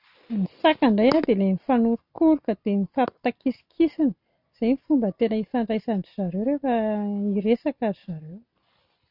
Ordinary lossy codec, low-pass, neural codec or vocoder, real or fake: none; 5.4 kHz; none; real